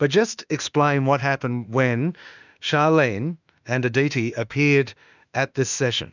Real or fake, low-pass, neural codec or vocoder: fake; 7.2 kHz; autoencoder, 48 kHz, 32 numbers a frame, DAC-VAE, trained on Japanese speech